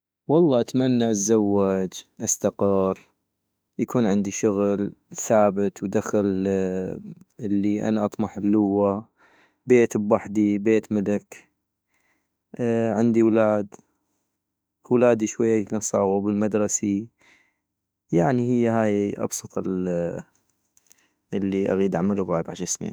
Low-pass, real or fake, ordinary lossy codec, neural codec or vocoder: none; fake; none; autoencoder, 48 kHz, 32 numbers a frame, DAC-VAE, trained on Japanese speech